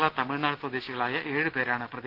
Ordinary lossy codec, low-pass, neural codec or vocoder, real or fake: Opus, 16 kbps; 5.4 kHz; none; real